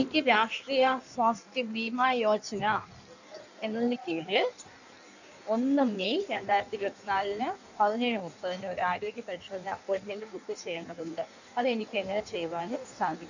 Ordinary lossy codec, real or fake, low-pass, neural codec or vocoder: none; fake; 7.2 kHz; codec, 16 kHz in and 24 kHz out, 1.1 kbps, FireRedTTS-2 codec